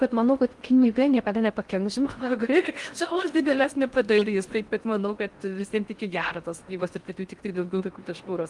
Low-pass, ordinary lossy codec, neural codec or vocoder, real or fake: 10.8 kHz; Opus, 24 kbps; codec, 16 kHz in and 24 kHz out, 0.6 kbps, FocalCodec, streaming, 4096 codes; fake